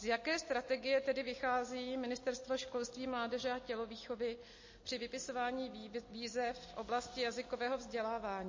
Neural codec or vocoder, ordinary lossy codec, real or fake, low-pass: none; MP3, 32 kbps; real; 7.2 kHz